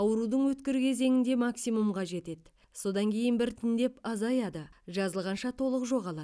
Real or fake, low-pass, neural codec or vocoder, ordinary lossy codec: real; none; none; none